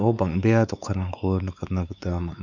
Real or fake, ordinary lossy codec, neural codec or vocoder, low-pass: fake; none; codec, 16 kHz, 4 kbps, X-Codec, WavLM features, trained on Multilingual LibriSpeech; 7.2 kHz